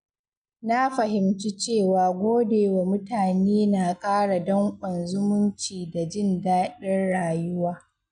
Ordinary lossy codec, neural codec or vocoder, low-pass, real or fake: none; none; 9.9 kHz; real